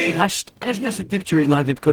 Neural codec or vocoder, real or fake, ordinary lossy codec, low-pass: codec, 44.1 kHz, 0.9 kbps, DAC; fake; Opus, 24 kbps; 19.8 kHz